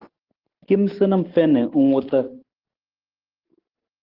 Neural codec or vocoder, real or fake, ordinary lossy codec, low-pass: none; real; Opus, 16 kbps; 5.4 kHz